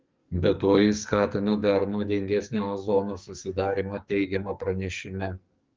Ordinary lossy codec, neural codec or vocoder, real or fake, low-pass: Opus, 32 kbps; codec, 44.1 kHz, 2.6 kbps, SNAC; fake; 7.2 kHz